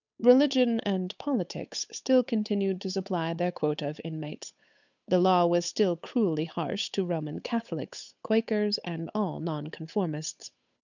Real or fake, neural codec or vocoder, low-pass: fake; codec, 16 kHz, 8 kbps, FunCodec, trained on Chinese and English, 25 frames a second; 7.2 kHz